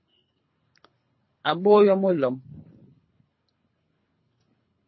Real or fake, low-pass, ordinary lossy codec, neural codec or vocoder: fake; 7.2 kHz; MP3, 24 kbps; codec, 24 kHz, 3 kbps, HILCodec